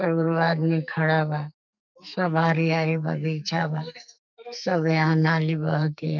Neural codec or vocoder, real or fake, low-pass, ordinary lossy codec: codec, 44.1 kHz, 2.6 kbps, SNAC; fake; 7.2 kHz; none